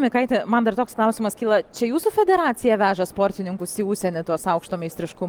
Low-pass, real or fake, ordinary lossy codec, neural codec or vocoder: 19.8 kHz; real; Opus, 24 kbps; none